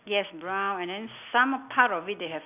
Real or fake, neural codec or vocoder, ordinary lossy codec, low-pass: real; none; none; 3.6 kHz